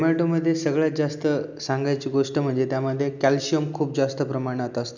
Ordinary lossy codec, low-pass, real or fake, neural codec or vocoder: none; 7.2 kHz; real; none